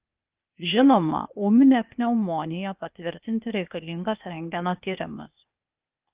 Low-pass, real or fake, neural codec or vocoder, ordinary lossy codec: 3.6 kHz; fake; codec, 16 kHz, 0.8 kbps, ZipCodec; Opus, 32 kbps